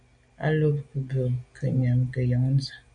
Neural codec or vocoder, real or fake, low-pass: none; real; 9.9 kHz